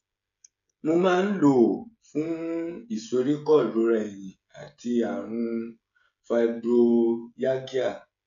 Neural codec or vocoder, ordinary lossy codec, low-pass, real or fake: codec, 16 kHz, 16 kbps, FreqCodec, smaller model; AAC, 96 kbps; 7.2 kHz; fake